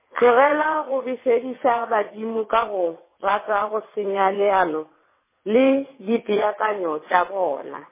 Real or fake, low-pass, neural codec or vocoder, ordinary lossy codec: fake; 3.6 kHz; vocoder, 22.05 kHz, 80 mel bands, WaveNeXt; MP3, 16 kbps